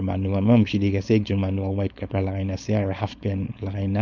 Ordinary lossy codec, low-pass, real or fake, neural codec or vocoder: none; 7.2 kHz; fake; codec, 16 kHz, 4.8 kbps, FACodec